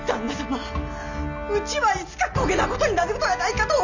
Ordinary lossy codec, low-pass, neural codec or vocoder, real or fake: none; 7.2 kHz; none; real